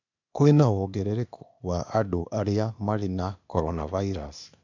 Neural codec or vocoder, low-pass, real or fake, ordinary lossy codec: codec, 16 kHz, 0.8 kbps, ZipCodec; 7.2 kHz; fake; none